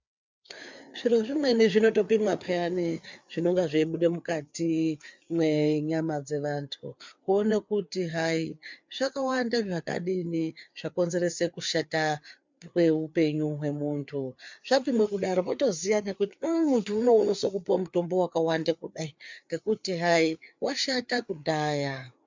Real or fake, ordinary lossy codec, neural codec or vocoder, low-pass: fake; MP3, 64 kbps; codec, 16 kHz, 4 kbps, FreqCodec, larger model; 7.2 kHz